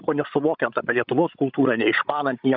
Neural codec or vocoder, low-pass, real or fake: codec, 16 kHz, 16 kbps, FreqCodec, larger model; 5.4 kHz; fake